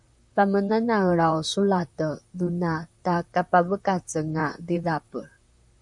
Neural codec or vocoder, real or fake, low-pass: vocoder, 44.1 kHz, 128 mel bands, Pupu-Vocoder; fake; 10.8 kHz